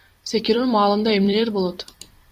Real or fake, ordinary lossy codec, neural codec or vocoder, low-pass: fake; Opus, 64 kbps; vocoder, 44.1 kHz, 128 mel bands every 256 samples, BigVGAN v2; 14.4 kHz